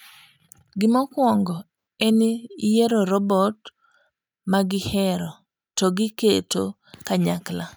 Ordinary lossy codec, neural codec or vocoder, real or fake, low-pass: none; none; real; none